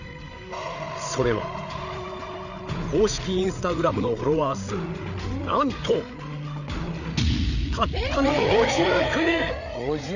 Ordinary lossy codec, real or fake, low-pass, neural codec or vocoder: none; fake; 7.2 kHz; codec, 16 kHz, 8 kbps, FreqCodec, larger model